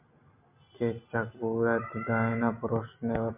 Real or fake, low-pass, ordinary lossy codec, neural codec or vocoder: real; 3.6 kHz; MP3, 32 kbps; none